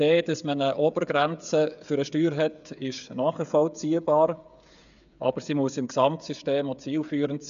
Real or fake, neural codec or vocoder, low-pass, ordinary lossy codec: fake; codec, 16 kHz, 8 kbps, FreqCodec, smaller model; 7.2 kHz; none